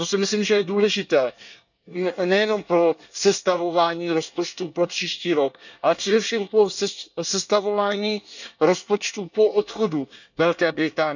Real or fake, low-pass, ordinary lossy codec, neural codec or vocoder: fake; 7.2 kHz; none; codec, 24 kHz, 1 kbps, SNAC